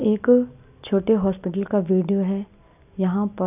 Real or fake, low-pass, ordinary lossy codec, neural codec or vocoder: real; 3.6 kHz; none; none